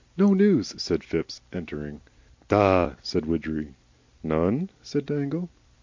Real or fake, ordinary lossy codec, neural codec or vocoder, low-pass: real; MP3, 64 kbps; none; 7.2 kHz